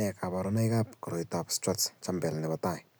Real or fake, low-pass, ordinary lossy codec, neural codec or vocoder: real; none; none; none